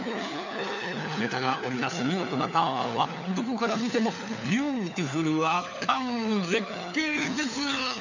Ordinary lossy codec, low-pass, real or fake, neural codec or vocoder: none; 7.2 kHz; fake; codec, 16 kHz, 4 kbps, FunCodec, trained on LibriTTS, 50 frames a second